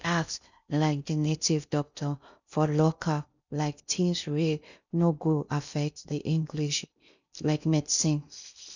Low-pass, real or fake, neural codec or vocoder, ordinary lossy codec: 7.2 kHz; fake; codec, 16 kHz in and 24 kHz out, 0.6 kbps, FocalCodec, streaming, 2048 codes; none